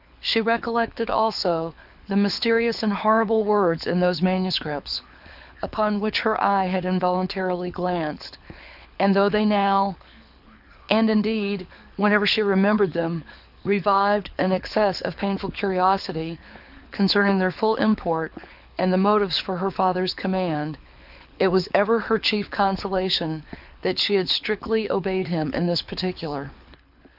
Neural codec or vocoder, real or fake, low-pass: codec, 24 kHz, 6 kbps, HILCodec; fake; 5.4 kHz